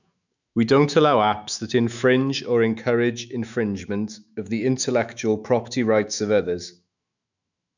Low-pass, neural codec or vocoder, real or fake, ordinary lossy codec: 7.2 kHz; autoencoder, 48 kHz, 128 numbers a frame, DAC-VAE, trained on Japanese speech; fake; none